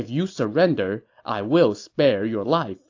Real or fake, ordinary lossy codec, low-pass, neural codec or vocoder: real; AAC, 48 kbps; 7.2 kHz; none